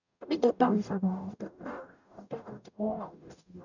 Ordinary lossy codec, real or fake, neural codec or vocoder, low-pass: none; fake; codec, 44.1 kHz, 0.9 kbps, DAC; 7.2 kHz